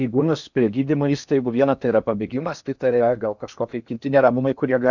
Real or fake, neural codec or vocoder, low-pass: fake; codec, 16 kHz in and 24 kHz out, 0.8 kbps, FocalCodec, streaming, 65536 codes; 7.2 kHz